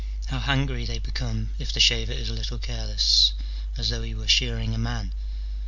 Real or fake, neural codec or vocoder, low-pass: real; none; 7.2 kHz